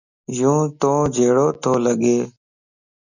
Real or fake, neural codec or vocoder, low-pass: real; none; 7.2 kHz